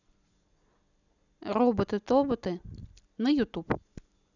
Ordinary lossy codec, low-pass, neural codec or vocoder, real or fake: none; 7.2 kHz; none; real